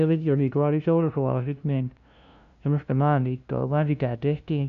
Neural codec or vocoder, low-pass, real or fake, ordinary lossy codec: codec, 16 kHz, 0.5 kbps, FunCodec, trained on LibriTTS, 25 frames a second; 7.2 kHz; fake; none